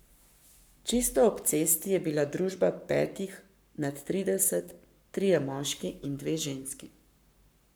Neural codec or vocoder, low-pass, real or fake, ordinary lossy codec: codec, 44.1 kHz, 7.8 kbps, Pupu-Codec; none; fake; none